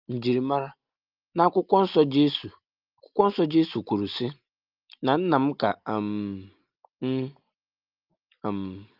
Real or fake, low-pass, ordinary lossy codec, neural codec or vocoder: real; 5.4 kHz; Opus, 24 kbps; none